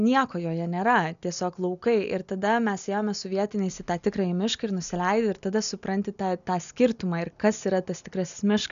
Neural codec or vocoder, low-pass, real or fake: none; 7.2 kHz; real